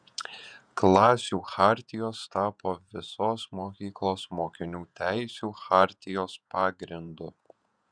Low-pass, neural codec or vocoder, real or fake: 9.9 kHz; vocoder, 48 kHz, 128 mel bands, Vocos; fake